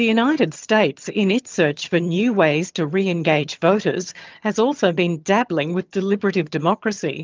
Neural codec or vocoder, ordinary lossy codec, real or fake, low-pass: vocoder, 22.05 kHz, 80 mel bands, HiFi-GAN; Opus, 24 kbps; fake; 7.2 kHz